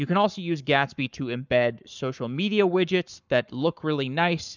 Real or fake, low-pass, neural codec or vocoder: fake; 7.2 kHz; codec, 44.1 kHz, 7.8 kbps, Pupu-Codec